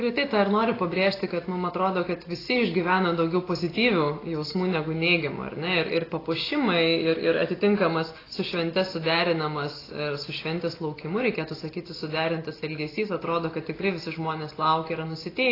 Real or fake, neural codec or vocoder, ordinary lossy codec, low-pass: real; none; AAC, 24 kbps; 5.4 kHz